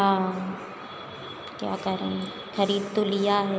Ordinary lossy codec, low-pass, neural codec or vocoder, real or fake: none; none; none; real